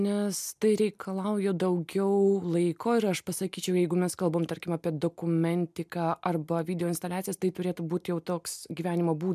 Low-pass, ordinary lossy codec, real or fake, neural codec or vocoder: 14.4 kHz; MP3, 96 kbps; real; none